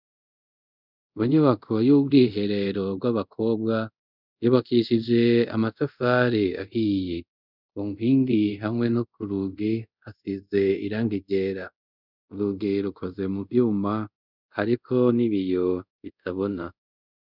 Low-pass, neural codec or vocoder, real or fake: 5.4 kHz; codec, 24 kHz, 0.5 kbps, DualCodec; fake